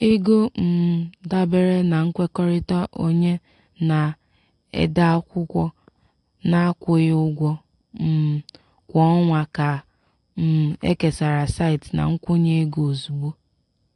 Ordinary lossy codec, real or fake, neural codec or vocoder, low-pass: AAC, 48 kbps; real; none; 10.8 kHz